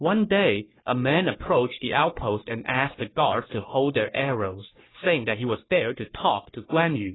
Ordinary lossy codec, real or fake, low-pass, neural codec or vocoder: AAC, 16 kbps; fake; 7.2 kHz; codec, 44.1 kHz, 3.4 kbps, Pupu-Codec